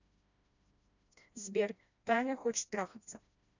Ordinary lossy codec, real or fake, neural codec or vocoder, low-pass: none; fake; codec, 16 kHz, 1 kbps, FreqCodec, smaller model; 7.2 kHz